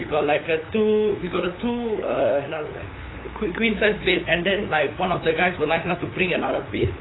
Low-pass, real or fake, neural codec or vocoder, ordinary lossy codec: 7.2 kHz; fake; codec, 16 kHz, 8 kbps, FunCodec, trained on LibriTTS, 25 frames a second; AAC, 16 kbps